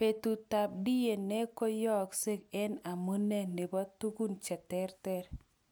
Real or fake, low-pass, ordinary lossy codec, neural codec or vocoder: real; none; none; none